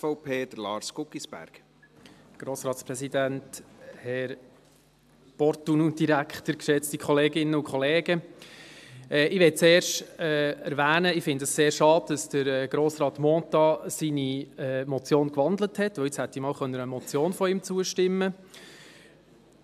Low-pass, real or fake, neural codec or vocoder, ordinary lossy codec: 14.4 kHz; real; none; AAC, 96 kbps